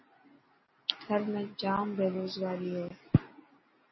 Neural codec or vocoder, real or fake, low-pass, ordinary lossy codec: none; real; 7.2 kHz; MP3, 24 kbps